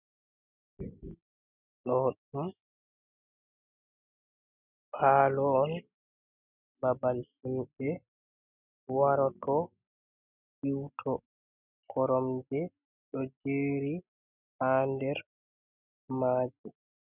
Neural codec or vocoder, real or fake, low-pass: none; real; 3.6 kHz